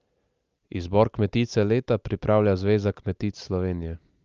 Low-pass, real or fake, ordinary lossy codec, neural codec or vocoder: 7.2 kHz; real; Opus, 24 kbps; none